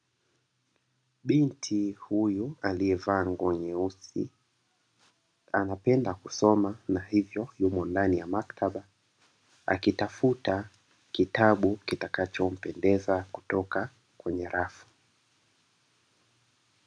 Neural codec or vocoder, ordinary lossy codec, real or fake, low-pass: none; MP3, 96 kbps; real; 9.9 kHz